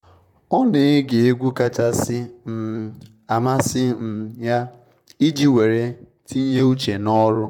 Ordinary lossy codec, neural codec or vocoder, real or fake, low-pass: none; vocoder, 44.1 kHz, 128 mel bands, Pupu-Vocoder; fake; 19.8 kHz